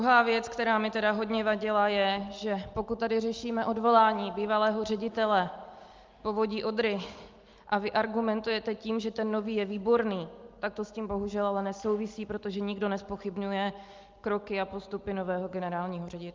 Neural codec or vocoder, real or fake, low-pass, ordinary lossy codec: none; real; 7.2 kHz; Opus, 32 kbps